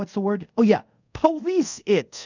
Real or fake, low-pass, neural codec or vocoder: fake; 7.2 kHz; codec, 24 kHz, 0.5 kbps, DualCodec